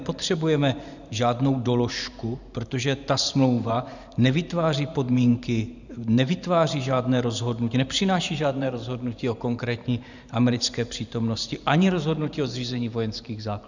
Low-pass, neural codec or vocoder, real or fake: 7.2 kHz; vocoder, 44.1 kHz, 128 mel bands every 512 samples, BigVGAN v2; fake